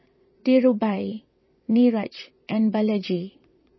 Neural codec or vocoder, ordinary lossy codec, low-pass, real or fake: vocoder, 22.05 kHz, 80 mel bands, WaveNeXt; MP3, 24 kbps; 7.2 kHz; fake